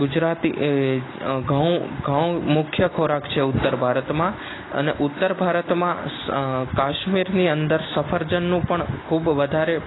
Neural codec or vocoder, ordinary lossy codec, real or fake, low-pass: none; AAC, 16 kbps; real; 7.2 kHz